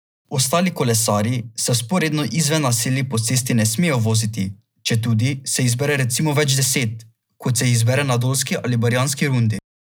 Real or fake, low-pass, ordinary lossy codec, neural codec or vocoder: real; none; none; none